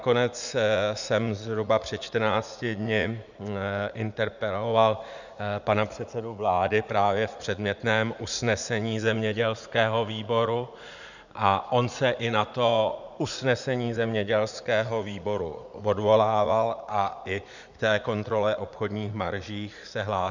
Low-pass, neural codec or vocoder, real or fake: 7.2 kHz; vocoder, 44.1 kHz, 80 mel bands, Vocos; fake